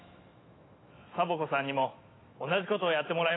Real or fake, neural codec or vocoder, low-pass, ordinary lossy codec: fake; autoencoder, 48 kHz, 128 numbers a frame, DAC-VAE, trained on Japanese speech; 7.2 kHz; AAC, 16 kbps